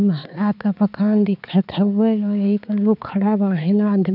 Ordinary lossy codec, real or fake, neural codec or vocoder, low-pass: none; fake; codec, 16 kHz, 4 kbps, X-Codec, HuBERT features, trained on balanced general audio; 5.4 kHz